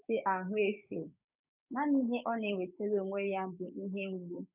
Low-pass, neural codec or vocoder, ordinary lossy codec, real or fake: 3.6 kHz; codec, 16 kHz, 6 kbps, DAC; none; fake